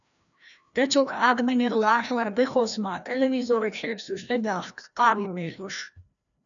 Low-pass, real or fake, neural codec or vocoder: 7.2 kHz; fake; codec, 16 kHz, 1 kbps, FreqCodec, larger model